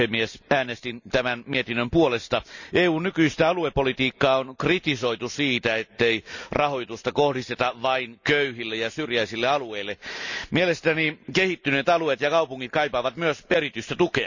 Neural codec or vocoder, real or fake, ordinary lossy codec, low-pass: none; real; none; 7.2 kHz